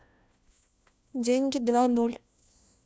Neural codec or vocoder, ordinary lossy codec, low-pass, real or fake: codec, 16 kHz, 1 kbps, FunCodec, trained on LibriTTS, 50 frames a second; none; none; fake